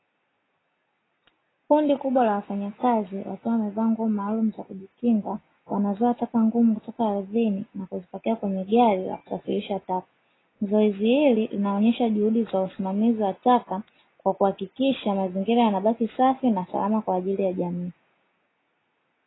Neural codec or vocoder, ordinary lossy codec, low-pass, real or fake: none; AAC, 16 kbps; 7.2 kHz; real